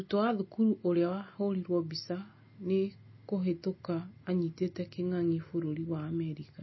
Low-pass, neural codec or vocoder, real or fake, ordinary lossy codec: 7.2 kHz; none; real; MP3, 24 kbps